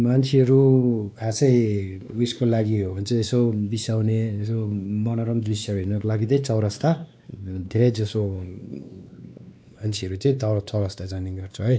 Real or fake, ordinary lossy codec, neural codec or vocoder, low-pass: fake; none; codec, 16 kHz, 2 kbps, X-Codec, WavLM features, trained on Multilingual LibriSpeech; none